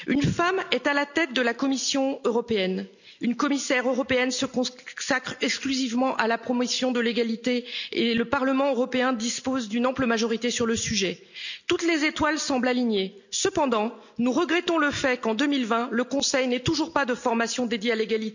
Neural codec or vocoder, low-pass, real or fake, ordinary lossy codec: none; 7.2 kHz; real; none